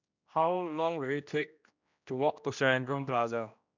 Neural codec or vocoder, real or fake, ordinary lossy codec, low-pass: codec, 16 kHz, 1 kbps, X-Codec, HuBERT features, trained on general audio; fake; none; 7.2 kHz